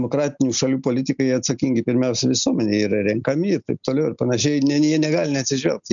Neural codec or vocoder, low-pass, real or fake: none; 7.2 kHz; real